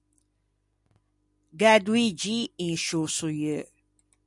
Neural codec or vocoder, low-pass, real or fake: none; 10.8 kHz; real